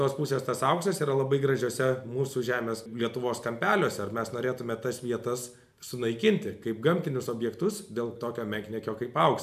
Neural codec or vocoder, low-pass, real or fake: none; 14.4 kHz; real